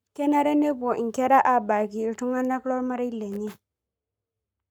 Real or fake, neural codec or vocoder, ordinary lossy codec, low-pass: fake; codec, 44.1 kHz, 7.8 kbps, Pupu-Codec; none; none